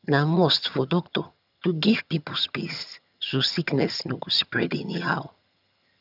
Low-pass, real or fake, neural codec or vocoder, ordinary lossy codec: 5.4 kHz; fake; vocoder, 22.05 kHz, 80 mel bands, HiFi-GAN; none